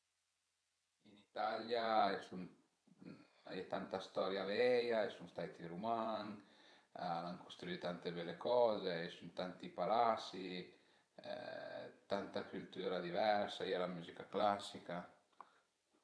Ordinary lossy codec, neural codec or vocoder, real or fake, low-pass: none; vocoder, 22.05 kHz, 80 mel bands, WaveNeXt; fake; none